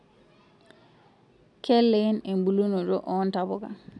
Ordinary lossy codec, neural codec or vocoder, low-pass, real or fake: none; none; 10.8 kHz; real